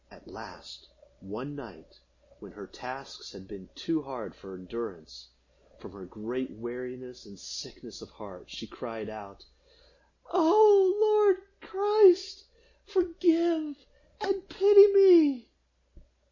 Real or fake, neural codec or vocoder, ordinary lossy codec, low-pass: real; none; MP3, 32 kbps; 7.2 kHz